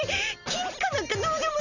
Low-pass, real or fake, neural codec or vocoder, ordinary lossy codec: 7.2 kHz; real; none; none